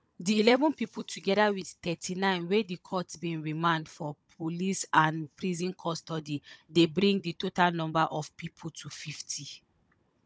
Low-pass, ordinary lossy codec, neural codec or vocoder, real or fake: none; none; codec, 16 kHz, 16 kbps, FunCodec, trained on Chinese and English, 50 frames a second; fake